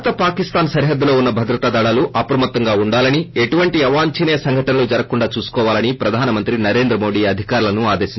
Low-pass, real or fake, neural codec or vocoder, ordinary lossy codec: 7.2 kHz; real; none; MP3, 24 kbps